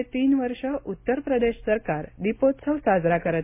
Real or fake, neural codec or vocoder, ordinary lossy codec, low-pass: real; none; MP3, 24 kbps; 3.6 kHz